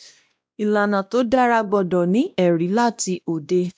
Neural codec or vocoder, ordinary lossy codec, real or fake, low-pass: codec, 16 kHz, 1 kbps, X-Codec, WavLM features, trained on Multilingual LibriSpeech; none; fake; none